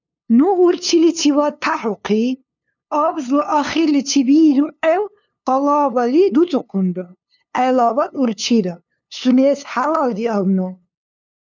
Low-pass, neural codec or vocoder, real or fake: 7.2 kHz; codec, 16 kHz, 2 kbps, FunCodec, trained on LibriTTS, 25 frames a second; fake